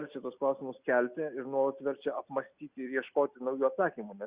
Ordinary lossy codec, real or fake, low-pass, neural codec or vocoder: Opus, 64 kbps; real; 3.6 kHz; none